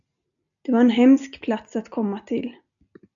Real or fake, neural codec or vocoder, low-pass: real; none; 7.2 kHz